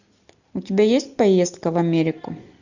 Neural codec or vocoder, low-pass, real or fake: none; 7.2 kHz; real